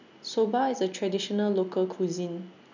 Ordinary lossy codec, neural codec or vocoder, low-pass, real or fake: none; none; 7.2 kHz; real